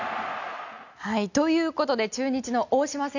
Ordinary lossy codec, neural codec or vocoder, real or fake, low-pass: none; none; real; 7.2 kHz